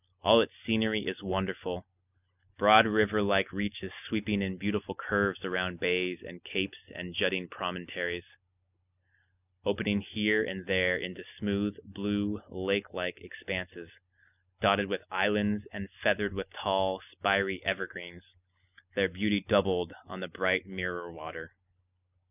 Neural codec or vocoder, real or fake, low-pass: none; real; 3.6 kHz